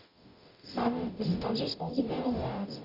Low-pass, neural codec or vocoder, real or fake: 5.4 kHz; codec, 44.1 kHz, 0.9 kbps, DAC; fake